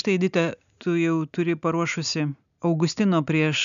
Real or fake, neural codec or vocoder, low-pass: real; none; 7.2 kHz